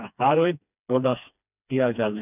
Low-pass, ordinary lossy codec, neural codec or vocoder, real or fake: 3.6 kHz; none; codec, 16 kHz, 2 kbps, FreqCodec, smaller model; fake